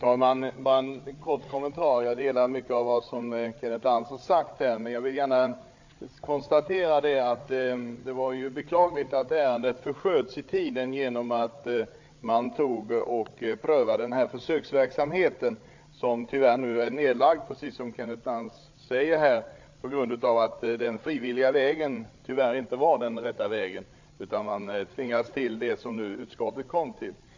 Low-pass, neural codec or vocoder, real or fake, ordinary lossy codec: 7.2 kHz; codec, 16 kHz, 8 kbps, FreqCodec, larger model; fake; AAC, 48 kbps